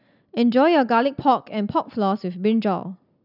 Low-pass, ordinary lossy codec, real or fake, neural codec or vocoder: 5.4 kHz; none; real; none